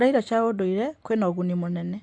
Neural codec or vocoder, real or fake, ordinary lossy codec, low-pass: none; real; AAC, 64 kbps; 9.9 kHz